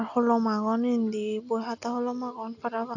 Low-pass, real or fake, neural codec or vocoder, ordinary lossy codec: 7.2 kHz; real; none; AAC, 48 kbps